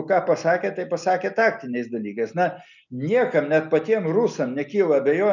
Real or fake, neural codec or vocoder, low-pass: real; none; 7.2 kHz